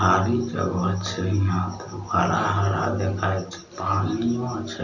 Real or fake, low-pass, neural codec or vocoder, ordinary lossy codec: real; 7.2 kHz; none; none